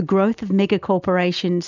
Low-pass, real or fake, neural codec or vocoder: 7.2 kHz; real; none